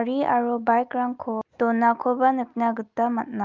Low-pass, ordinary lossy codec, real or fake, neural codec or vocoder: 7.2 kHz; Opus, 32 kbps; real; none